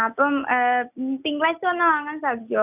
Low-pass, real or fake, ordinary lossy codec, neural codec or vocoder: 3.6 kHz; real; none; none